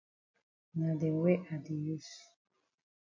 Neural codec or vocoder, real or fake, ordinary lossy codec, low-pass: none; real; MP3, 48 kbps; 7.2 kHz